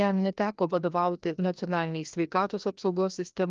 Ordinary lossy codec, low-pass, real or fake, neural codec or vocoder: Opus, 24 kbps; 7.2 kHz; fake; codec, 16 kHz, 1 kbps, FreqCodec, larger model